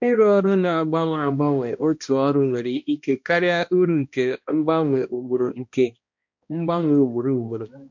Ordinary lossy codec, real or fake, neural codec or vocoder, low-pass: MP3, 48 kbps; fake; codec, 16 kHz, 1 kbps, X-Codec, HuBERT features, trained on balanced general audio; 7.2 kHz